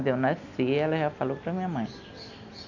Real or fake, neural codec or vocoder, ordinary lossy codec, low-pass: real; none; none; 7.2 kHz